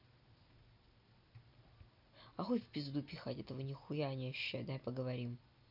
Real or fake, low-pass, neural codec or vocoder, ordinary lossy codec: real; 5.4 kHz; none; none